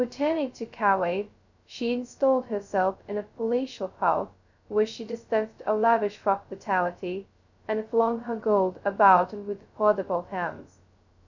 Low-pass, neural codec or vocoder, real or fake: 7.2 kHz; codec, 16 kHz, 0.2 kbps, FocalCodec; fake